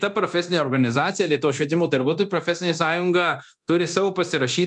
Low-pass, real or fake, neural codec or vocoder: 10.8 kHz; fake; codec, 24 kHz, 0.9 kbps, DualCodec